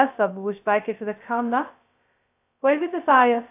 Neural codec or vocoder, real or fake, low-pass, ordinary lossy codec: codec, 16 kHz, 0.2 kbps, FocalCodec; fake; 3.6 kHz; AAC, 24 kbps